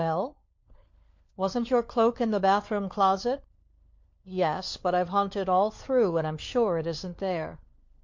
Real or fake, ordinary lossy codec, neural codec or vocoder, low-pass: fake; MP3, 48 kbps; codec, 16 kHz, 4 kbps, FunCodec, trained on LibriTTS, 50 frames a second; 7.2 kHz